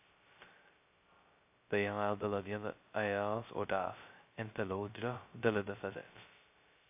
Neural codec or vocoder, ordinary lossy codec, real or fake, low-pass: codec, 16 kHz, 0.2 kbps, FocalCodec; AAC, 32 kbps; fake; 3.6 kHz